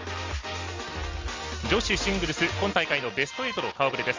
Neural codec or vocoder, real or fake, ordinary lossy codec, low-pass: none; real; Opus, 32 kbps; 7.2 kHz